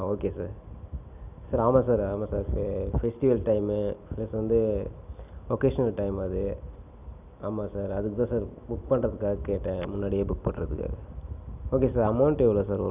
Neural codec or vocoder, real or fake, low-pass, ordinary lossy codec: none; real; 3.6 kHz; none